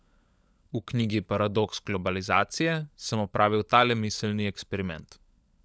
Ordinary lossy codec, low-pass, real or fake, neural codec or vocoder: none; none; fake; codec, 16 kHz, 16 kbps, FunCodec, trained on LibriTTS, 50 frames a second